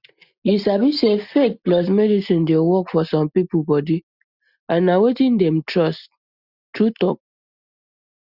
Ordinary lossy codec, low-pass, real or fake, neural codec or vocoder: Opus, 64 kbps; 5.4 kHz; real; none